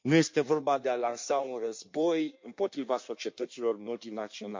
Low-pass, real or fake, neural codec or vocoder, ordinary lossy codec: 7.2 kHz; fake; codec, 16 kHz in and 24 kHz out, 1.1 kbps, FireRedTTS-2 codec; MP3, 48 kbps